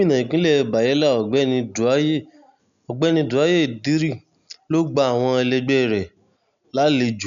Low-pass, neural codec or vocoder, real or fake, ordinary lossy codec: 7.2 kHz; none; real; none